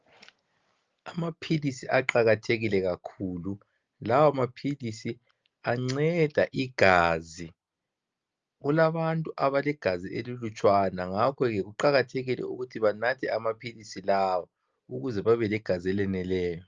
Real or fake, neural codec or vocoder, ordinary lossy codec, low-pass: real; none; Opus, 24 kbps; 7.2 kHz